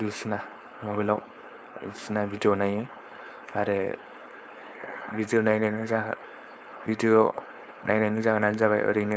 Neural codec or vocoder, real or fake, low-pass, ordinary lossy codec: codec, 16 kHz, 4.8 kbps, FACodec; fake; none; none